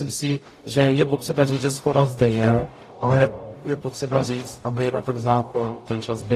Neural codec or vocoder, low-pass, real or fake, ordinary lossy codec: codec, 44.1 kHz, 0.9 kbps, DAC; 14.4 kHz; fake; AAC, 48 kbps